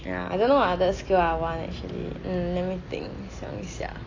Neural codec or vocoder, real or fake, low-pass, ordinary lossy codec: none; real; 7.2 kHz; AAC, 32 kbps